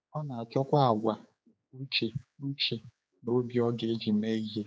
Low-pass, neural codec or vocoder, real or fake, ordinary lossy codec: none; codec, 16 kHz, 4 kbps, X-Codec, HuBERT features, trained on general audio; fake; none